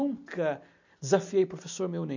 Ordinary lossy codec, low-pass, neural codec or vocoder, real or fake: none; 7.2 kHz; none; real